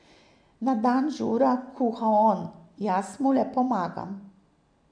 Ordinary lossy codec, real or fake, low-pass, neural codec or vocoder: none; real; 9.9 kHz; none